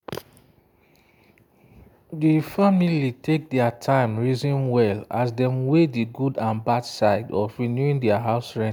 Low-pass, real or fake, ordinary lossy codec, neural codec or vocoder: 19.8 kHz; real; none; none